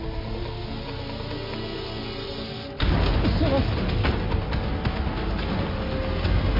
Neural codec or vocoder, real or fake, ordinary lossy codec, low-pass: none; real; MP3, 24 kbps; 5.4 kHz